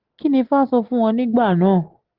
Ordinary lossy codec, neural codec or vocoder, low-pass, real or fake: Opus, 16 kbps; none; 5.4 kHz; real